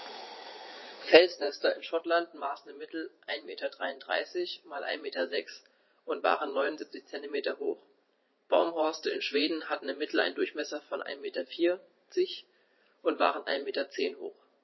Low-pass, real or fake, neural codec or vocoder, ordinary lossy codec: 7.2 kHz; fake; vocoder, 44.1 kHz, 80 mel bands, Vocos; MP3, 24 kbps